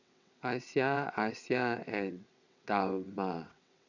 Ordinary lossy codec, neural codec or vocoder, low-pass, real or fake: none; vocoder, 22.05 kHz, 80 mel bands, WaveNeXt; 7.2 kHz; fake